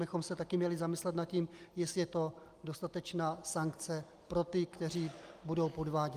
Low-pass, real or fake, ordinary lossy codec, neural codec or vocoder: 14.4 kHz; real; Opus, 32 kbps; none